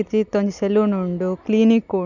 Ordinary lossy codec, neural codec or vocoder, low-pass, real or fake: none; none; 7.2 kHz; real